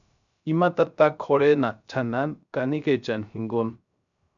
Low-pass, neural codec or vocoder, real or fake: 7.2 kHz; codec, 16 kHz, 0.3 kbps, FocalCodec; fake